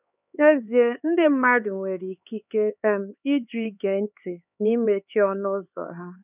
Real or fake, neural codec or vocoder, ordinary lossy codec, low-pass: fake; codec, 16 kHz, 4 kbps, X-Codec, HuBERT features, trained on LibriSpeech; none; 3.6 kHz